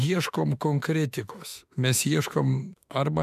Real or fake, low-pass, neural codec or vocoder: fake; 14.4 kHz; autoencoder, 48 kHz, 32 numbers a frame, DAC-VAE, trained on Japanese speech